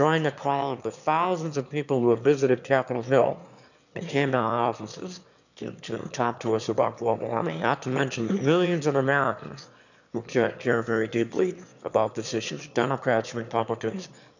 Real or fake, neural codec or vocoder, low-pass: fake; autoencoder, 22.05 kHz, a latent of 192 numbers a frame, VITS, trained on one speaker; 7.2 kHz